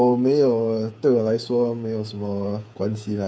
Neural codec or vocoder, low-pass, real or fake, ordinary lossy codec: codec, 16 kHz, 8 kbps, FreqCodec, smaller model; none; fake; none